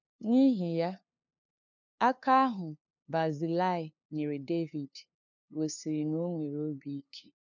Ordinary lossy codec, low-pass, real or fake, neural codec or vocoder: none; 7.2 kHz; fake; codec, 16 kHz, 2 kbps, FunCodec, trained on LibriTTS, 25 frames a second